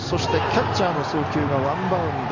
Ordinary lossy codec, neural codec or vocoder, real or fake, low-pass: none; none; real; 7.2 kHz